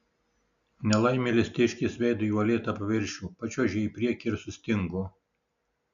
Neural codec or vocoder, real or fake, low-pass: none; real; 7.2 kHz